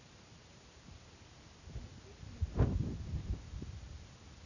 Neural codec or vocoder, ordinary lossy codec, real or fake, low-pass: none; none; real; 7.2 kHz